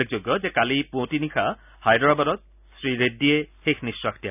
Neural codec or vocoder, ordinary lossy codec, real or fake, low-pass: none; none; real; 3.6 kHz